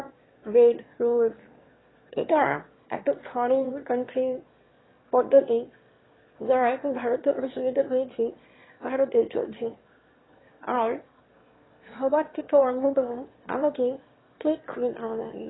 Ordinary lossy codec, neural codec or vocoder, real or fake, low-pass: AAC, 16 kbps; autoencoder, 22.05 kHz, a latent of 192 numbers a frame, VITS, trained on one speaker; fake; 7.2 kHz